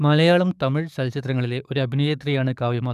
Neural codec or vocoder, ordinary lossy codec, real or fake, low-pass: codec, 44.1 kHz, 7.8 kbps, DAC; none; fake; 14.4 kHz